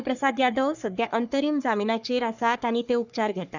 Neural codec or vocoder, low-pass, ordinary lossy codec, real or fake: codec, 44.1 kHz, 3.4 kbps, Pupu-Codec; 7.2 kHz; none; fake